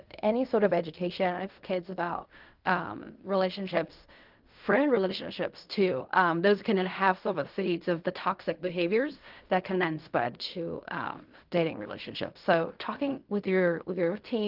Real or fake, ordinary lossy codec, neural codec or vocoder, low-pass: fake; Opus, 24 kbps; codec, 16 kHz in and 24 kHz out, 0.4 kbps, LongCat-Audio-Codec, fine tuned four codebook decoder; 5.4 kHz